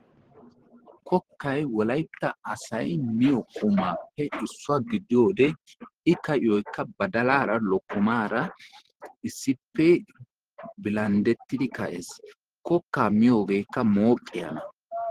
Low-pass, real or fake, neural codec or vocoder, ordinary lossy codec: 14.4 kHz; fake; vocoder, 44.1 kHz, 128 mel bands every 512 samples, BigVGAN v2; Opus, 16 kbps